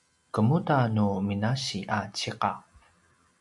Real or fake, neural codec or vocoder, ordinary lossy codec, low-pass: real; none; MP3, 96 kbps; 10.8 kHz